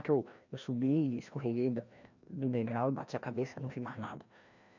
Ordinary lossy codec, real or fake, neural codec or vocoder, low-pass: none; fake; codec, 16 kHz, 1 kbps, FreqCodec, larger model; 7.2 kHz